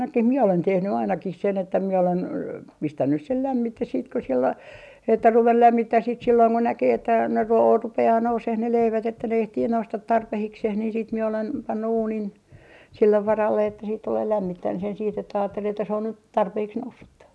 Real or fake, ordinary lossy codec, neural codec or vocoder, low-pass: real; none; none; none